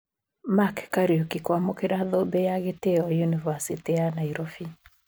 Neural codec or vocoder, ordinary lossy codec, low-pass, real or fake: none; none; none; real